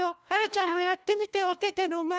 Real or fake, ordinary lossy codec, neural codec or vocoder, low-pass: fake; none; codec, 16 kHz, 1 kbps, FunCodec, trained on LibriTTS, 50 frames a second; none